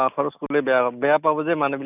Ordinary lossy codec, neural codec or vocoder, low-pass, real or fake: none; none; 3.6 kHz; real